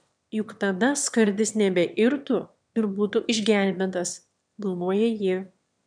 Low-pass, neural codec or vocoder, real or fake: 9.9 kHz; autoencoder, 22.05 kHz, a latent of 192 numbers a frame, VITS, trained on one speaker; fake